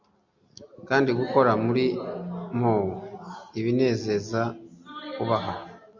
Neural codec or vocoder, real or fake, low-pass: none; real; 7.2 kHz